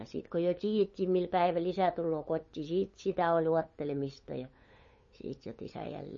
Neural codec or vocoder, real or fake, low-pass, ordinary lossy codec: codec, 16 kHz, 8 kbps, FunCodec, trained on LibriTTS, 25 frames a second; fake; 7.2 kHz; MP3, 32 kbps